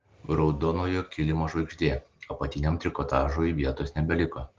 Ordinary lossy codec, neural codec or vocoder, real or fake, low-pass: Opus, 16 kbps; none; real; 7.2 kHz